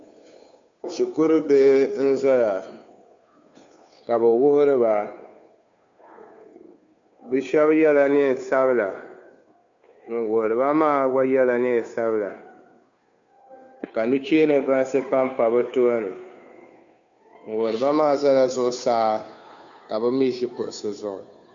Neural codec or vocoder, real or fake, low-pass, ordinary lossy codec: codec, 16 kHz, 2 kbps, FunCodec, trained on Chinese and English, 25 frames a second; fake; 7.2 kHz; MP3, 64 kbps